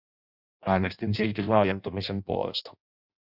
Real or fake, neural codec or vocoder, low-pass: fake; codec, 16 kHz in and 24 kHz out, 0.6 kbps, FireRedTTS-2 codec; 5.4 kHz